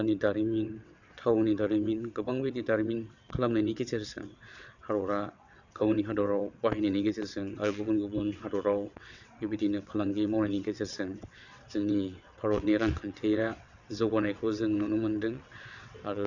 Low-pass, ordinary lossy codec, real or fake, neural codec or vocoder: 7.2 kHz; none; fake; vocoder, 22.05 kHz, 80 mel bands, WaveNeXt